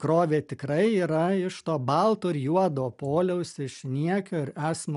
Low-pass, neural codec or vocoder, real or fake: 10.8 kHz; none; real